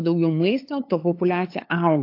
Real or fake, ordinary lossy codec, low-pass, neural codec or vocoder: fake; AAC, 32 kbps; 5.4 kHz; codec, 16 kHz, 8 kbps, FunCodec, trained on LibriTTS, 25 frames a second